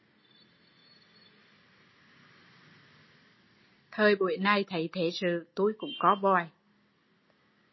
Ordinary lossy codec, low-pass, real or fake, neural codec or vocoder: MP3, 24 kbps; 7.2 kHz; real; none